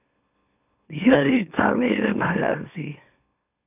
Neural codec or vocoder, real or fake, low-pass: autoencoder, 44.1 kHz, a latent of 192 numbers a frame, MeloTTS; fake; 3.6 kHz